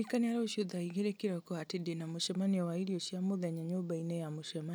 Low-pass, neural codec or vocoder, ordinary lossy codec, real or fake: none; none; none; real